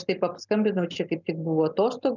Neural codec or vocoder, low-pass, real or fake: none; 7.2 kHz; real